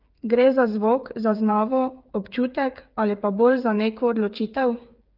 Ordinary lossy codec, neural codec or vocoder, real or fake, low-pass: Opus, 24 kbps; codec, 16 kHz, 8 kbps, FreqCodec, smaller model; fake; 5.4 kHz